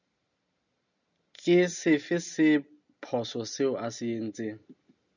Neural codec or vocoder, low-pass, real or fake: none; 7.2 kHz; real